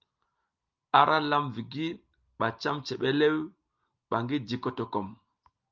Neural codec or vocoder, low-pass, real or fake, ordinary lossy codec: none; 7.2 kHz; real; Opus, 24 kbps